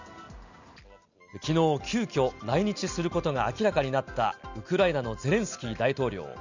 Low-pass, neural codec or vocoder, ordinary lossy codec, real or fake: 7.2 kHz; none; none; real